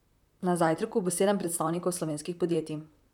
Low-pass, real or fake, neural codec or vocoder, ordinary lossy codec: 19.8 kHz; fake; vocoder, 44.1 kHz, 128 mel bands, Pupu-Vocoder; none